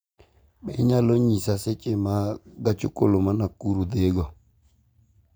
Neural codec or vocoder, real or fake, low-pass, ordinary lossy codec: none; real; none; none